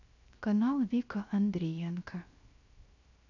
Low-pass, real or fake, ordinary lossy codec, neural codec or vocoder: 7.2 kHz; fake; none; codec, 16 kHz, 0.7 kbps, FocalCodec